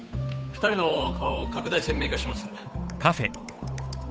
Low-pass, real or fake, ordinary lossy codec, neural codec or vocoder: none; fake; none; codec, 16 kHz, 8 kbps, FunCodec, trained on Chinese and English, 25 frames a second